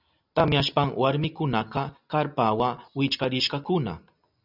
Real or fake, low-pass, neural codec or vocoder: real; 5.4 kHz; none